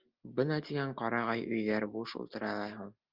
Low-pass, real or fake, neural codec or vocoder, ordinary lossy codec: 5.4 kHz; real; none; Opus, 32 kbps